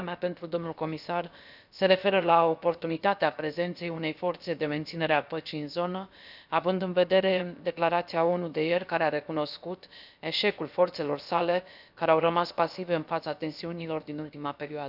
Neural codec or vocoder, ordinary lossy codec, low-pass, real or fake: codec, 16 kHz, about 1 kbps, DyCAST, with the encoder's durations; none; 5.4 kHz; fake